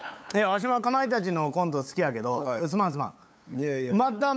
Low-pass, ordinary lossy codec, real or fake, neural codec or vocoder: none; none; fake; codec, 16 kHz, 8 kbps, FunCodec, trained on LibriTTS, 25 frames a second